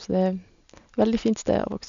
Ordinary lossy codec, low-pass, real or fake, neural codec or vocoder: MP3, 64 kbps; 7.2 kHz; real; none